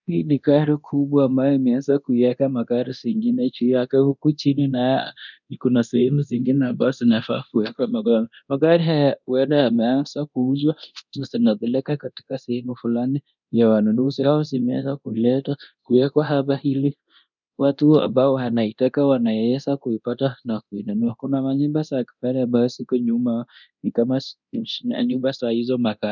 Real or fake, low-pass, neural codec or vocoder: fake; 7.2 kHz; codec, 24 kHz, 0.9 kbps, DualCodec